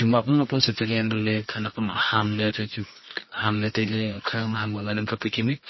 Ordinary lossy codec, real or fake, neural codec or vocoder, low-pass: MP3, 24 kbps; fake; codec, 24 kHz, 0.9 kbps, WavTokenizer, medium music audio release; 7.2 kHz